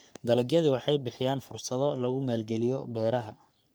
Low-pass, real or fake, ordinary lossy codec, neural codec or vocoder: none; fake; none; codec, 44.1 kHz, 3.4 kbps, Pupu-Codec